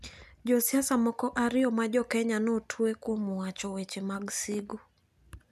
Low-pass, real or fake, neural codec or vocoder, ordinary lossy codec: 14.4 kHz; real; none; none